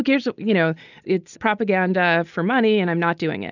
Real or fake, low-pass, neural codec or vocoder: real; 7.2 kHz; none